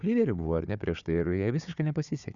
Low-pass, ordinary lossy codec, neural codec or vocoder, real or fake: 7.2 kHz; Opus, 64 kbps; codec, 16 kHz, 4 kbps, FunCodec, trained on Chinese and English, 50 frames a second; fake